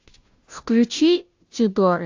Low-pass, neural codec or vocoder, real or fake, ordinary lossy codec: 7.2 kHz; codec, 16 kHz, 0.5 kbps, FunCodec, trained on Chinese and English, 25 frames a second; fake; AAC, 48 kbps